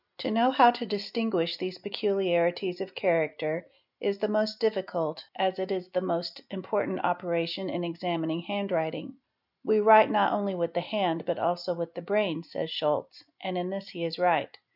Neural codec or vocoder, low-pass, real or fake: none; 5.4 kHz; real